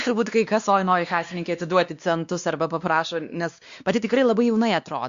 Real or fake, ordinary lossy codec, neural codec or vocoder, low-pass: fake; Opus, 64 kbps; codec, 16 kHz, 2 kbps, X-Codec, WavLM features, trained on Multilingual LibriSpeech; 7.2 kHz